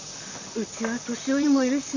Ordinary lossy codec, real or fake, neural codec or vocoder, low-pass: Opus, 64 kbps; fake; vocoder, 44.1 kHz, 128 mel bands, Pupu-Vocoder; 7.2 kHz